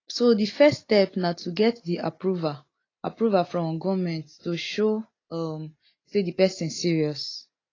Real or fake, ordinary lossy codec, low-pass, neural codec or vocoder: real; AAC, 32 kbps; 7.2 kHz; none